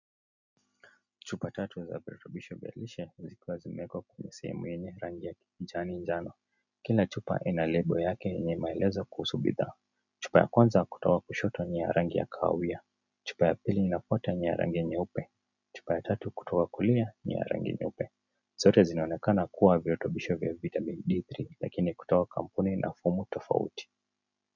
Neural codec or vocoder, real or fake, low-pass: none; real; 7.2 kHz